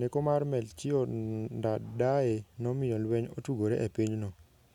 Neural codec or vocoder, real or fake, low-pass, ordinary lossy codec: none; real; 19.8 kHz; none